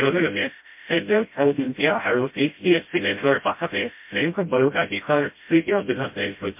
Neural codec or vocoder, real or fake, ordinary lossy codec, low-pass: codec, 16 kHz, 0.5 kbps, FreqCodec, smaller model; fake; MP3, 32 kbps; 3.6 kHz